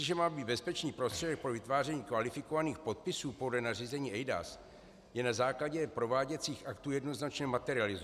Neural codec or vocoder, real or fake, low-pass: none; real; 14.4 kHz